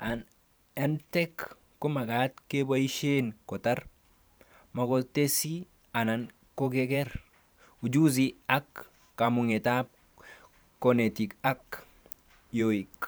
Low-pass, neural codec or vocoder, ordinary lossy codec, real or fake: none; vocoder, 44.1 kHz, 128 mel bands every 512 samples, BigVGAN v2; none; fake